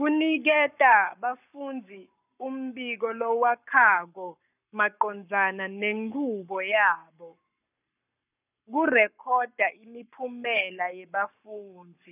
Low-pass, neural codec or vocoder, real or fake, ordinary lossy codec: 3.6 kHz; codec, 44.1 kHz, 7.8 kbps, Pupu-Codec; fake; none